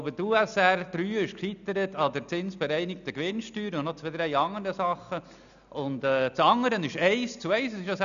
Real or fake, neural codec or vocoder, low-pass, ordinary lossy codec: real; none; 7.2 kHz; none